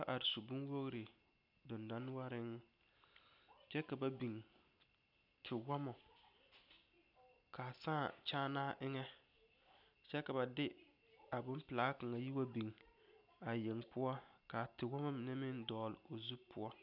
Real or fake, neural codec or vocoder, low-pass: real; none; 5.4 kHz